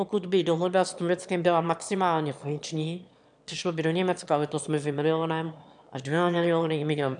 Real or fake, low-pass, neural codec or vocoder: fake; 9.9 kHz; autoencoder, 22.05 kHz, a latent of 192 numbers a frame, VITS, trained on one speaker